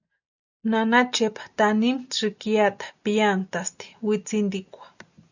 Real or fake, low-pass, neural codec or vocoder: real; 7.2 kHz; none